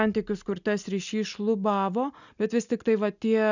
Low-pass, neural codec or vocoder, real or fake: 7.2 kHz; none; real